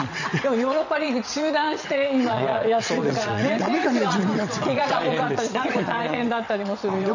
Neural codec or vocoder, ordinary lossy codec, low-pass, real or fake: vocoder, 22.05 kHz, 80 mel bands, WaveNeXt; none; 7.2 kHz; fake